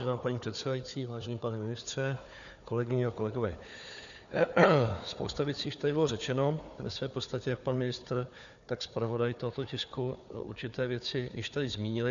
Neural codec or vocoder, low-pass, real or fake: codec, 16 kHz, 4 kbps, FunCodec, trained on Chinese and English, 50 frames a second; 7.2 kHz; fake